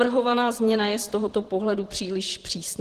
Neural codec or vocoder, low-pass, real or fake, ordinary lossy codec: vocoder, 44.1 kHz, 128 mel bands, Pupu-Vocoder; 14.4 kHz; fake; Opus, 16 kbps